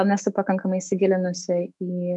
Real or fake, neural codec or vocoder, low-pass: fake; autoencoder, 48 kHz, 128 numbers a frame, DAC-VAE, trained on Japanese speech; 10.8 kHz